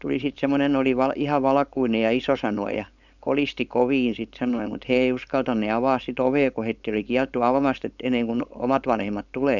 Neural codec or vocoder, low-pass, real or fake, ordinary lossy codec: codec, 16 kHz, 4.8 kbps, FACodec; 7.2 kHz; fake; none